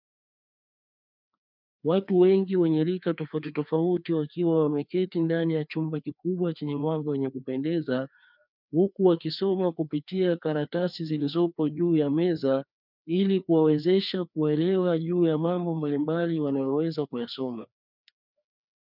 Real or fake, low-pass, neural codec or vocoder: fake; 5.4 kHz; codec, 16 kHz, 2 kbps, FreqCodec, larger model